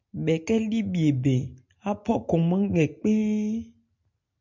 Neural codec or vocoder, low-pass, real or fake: none; 7.2 kHz; real